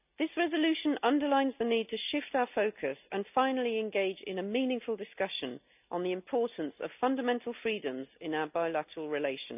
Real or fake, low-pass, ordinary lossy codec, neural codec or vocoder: real; 3.6 kHz; none; none